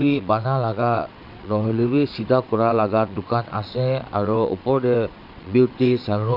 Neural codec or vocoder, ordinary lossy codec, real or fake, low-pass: vocoder, 22.05 kHz, 80 mel bands, WaveNeXt; AAC, 48 kbps; fake; 5.4 kHz